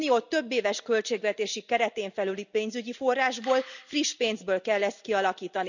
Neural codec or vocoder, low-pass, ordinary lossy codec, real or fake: none; 7.2 kHz; none; real